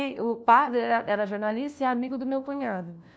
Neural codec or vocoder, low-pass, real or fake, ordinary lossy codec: codec, 16 kHz, 1 kbps, FunCodec, trained on LibriTTS, 50 frames a second; none; fake; none